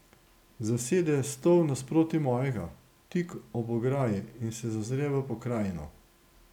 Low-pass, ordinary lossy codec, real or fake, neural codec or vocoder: 19.8 kHz; none; real; none